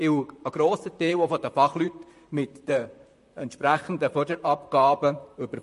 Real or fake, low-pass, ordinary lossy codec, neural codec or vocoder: fake; 14.4 kHz; MP3, 48 kbps; vocoder, 44.1 kHz, 128 mel bands, Pupu-Vocoder